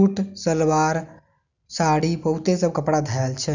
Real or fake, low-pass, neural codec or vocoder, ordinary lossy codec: real; 7.2 kHz; none; none